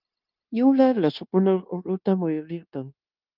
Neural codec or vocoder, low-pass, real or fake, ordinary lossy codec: codec, 16 kHz, 0.9 kbps, LongCat-Audio-Codec; 5.4 kHz; fake; Opus, 24 kbps